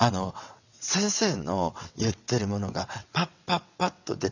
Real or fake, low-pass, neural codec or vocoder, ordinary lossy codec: fake; 7.2 kHz; vocoder, 22.05 kHz, 80 mel bands, WaveNeXt; none